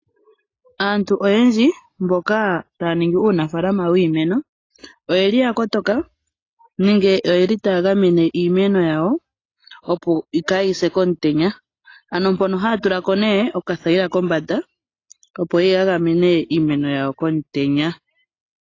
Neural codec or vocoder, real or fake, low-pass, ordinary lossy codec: none; real; 7.2 kHz; AAC, 32 kbps